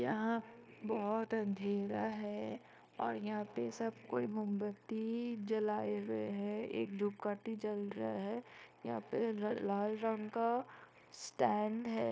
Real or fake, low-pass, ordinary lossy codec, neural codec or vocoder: fake; none; none; codec, 16 kHz, 0.9 kbps, LongCat-Audio-Codec